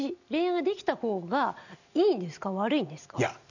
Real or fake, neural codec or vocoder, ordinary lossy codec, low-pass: real; none; none; 7.2 kHz